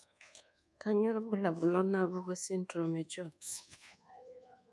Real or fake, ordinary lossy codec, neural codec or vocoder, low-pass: fake; none; codec, 24 kHz, 1.2 kbps, DualCodec; none